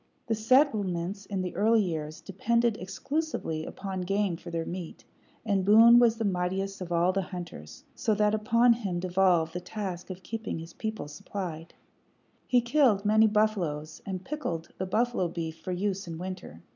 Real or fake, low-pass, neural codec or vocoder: real; 7.2 kHz; none